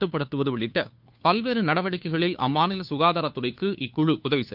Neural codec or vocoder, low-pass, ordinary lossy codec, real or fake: codec, 16 kHz, 2 kbps, FunCodec, trained on Chinese and English, 25 frames a second; 5.4 kHz; none; fake